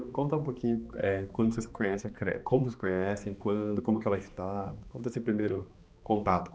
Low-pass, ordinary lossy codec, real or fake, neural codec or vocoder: none; none; fake; codec, 16 kHz, 2 kbps, X-Codec, HuBERT features, trained on balanced general audio